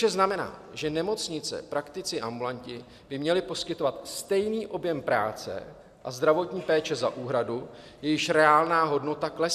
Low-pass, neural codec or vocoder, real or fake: 14.4 kHz; none; real